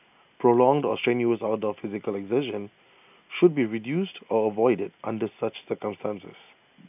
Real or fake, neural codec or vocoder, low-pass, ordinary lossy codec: real; none; 3.6 kHz; none